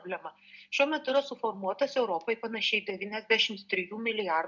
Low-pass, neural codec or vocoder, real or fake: 7.2 kHz; none; real